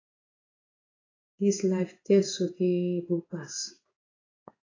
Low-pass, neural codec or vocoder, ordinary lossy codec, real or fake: 7.2 kHz; codec, 16 kHz in and 24 kHz out, 1 kbps, XY-Tokenizer; AAC, 32 kbps; fake